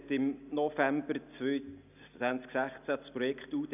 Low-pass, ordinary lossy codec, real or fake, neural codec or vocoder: 3.6 kHz; none; real; none